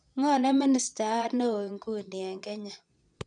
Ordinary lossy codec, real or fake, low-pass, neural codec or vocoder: none; fake; 9.9 kHz; vocoder, 22.05 kHz, 80 mel bands, Vocos